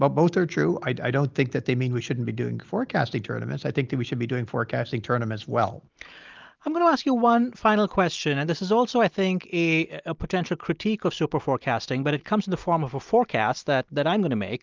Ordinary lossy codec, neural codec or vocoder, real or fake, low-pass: Opus, 24 kbps; none; real; 7.2 kHz